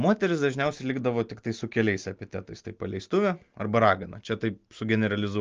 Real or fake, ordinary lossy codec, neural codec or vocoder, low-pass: real; Opus, 24 kbps; none; 7.2 kHz